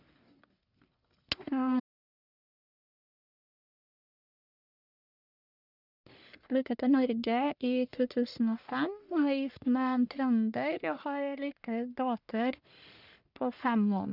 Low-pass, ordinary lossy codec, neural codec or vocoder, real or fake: 5.4 kHz; none; codec, 44.1 kHz, 1.7 kbps, Pupu-Codec; fake